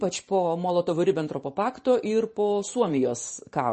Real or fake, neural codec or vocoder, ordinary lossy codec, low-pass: fake; vocoder, 44.1 kHz, 128 mel bands every 512 samples, BigVGAN v2; MP3, 32 kbps; 10.8 kHz